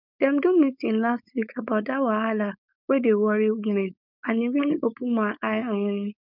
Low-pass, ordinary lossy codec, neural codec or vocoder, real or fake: 5.4 kHz; none; codec, 16 kHz, 4.8 kbps, FACodec; fake